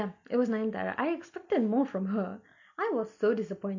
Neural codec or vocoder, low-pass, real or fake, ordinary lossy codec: none; 7.2 kHz; real; none